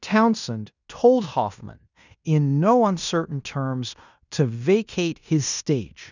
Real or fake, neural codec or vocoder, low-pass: fake; codec, 16 kHz in and 24 kHz out, 0.9 kbps, LongCat-Audio-Codec, fine tuned four codebook decoder; 7.2 kHz